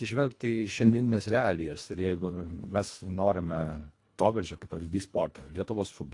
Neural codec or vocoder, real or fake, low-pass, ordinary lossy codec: codec, 24 kHz, 1.5 kbps, HILCodec; fake; 10.8 kHz; AAC, 48 kbps